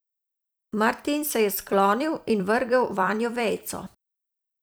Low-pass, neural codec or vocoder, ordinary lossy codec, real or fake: none; vocoder, 44.1 kHz, 128 mel bands every 256 samples, BigVGAN v2; none; fake